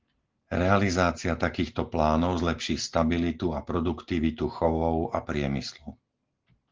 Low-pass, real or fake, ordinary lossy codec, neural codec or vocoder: 7.2 kHz; real; Opus, 16 kbps; none